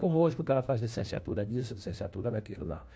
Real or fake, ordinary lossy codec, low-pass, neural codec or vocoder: fake; none; none; codec, 16 kHz, 1 kbps, FunCodec, trained on LibriTTS, 50 frames a second